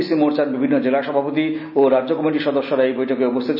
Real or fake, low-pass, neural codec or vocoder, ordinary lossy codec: real; 5.4 kHz; none; none